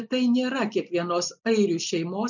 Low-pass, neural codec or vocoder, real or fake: 7.2 kHz; none; real